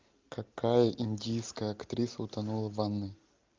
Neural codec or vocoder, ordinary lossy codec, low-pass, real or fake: none; Opus, 24 kbps; 7.2 kHz; real